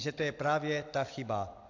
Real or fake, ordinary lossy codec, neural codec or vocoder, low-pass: real; AAC, 48 kbps; none; 7.2 kHz